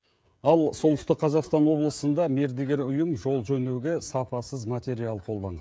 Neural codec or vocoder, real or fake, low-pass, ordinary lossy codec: codec, 16 kHz, 16 kbps, FreqCodec, smaller model; fake; none; none